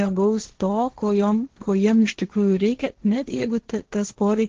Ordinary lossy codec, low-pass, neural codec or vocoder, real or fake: Opus, 16 kbps; 7.2 kHz; codec, 16 kHz, 1.1 kbps, Voila-Tokenizer; fake